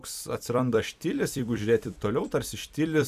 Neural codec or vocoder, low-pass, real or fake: vocoder, 44.1 kHz, 128 mel bands every 256 samples, BigVGAN v2; 14.4 kHz; fake